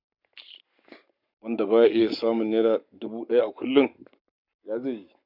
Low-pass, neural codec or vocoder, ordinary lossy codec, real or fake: 5.4 kHz; codec, 44.1 kHz, 7.8 kbps, Pupu-Codec; none; fake